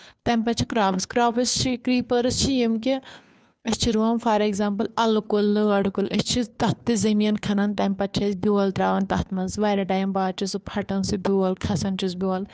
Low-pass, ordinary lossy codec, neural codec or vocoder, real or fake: none; none; codec, 16 kHz, 2 kbps, FunCodec, trained on Chinese and English, 25 frames a second; fake